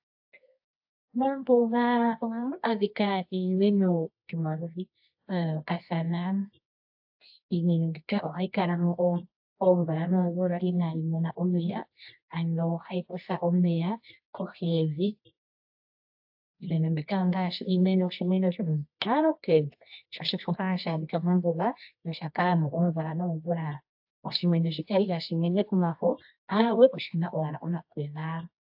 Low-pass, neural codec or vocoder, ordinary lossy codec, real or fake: 5.4 kHz; codec, 24 kHz, 0.9 kbps, WavTokenizer, medium music audio release; AAC, 48 kbps; fake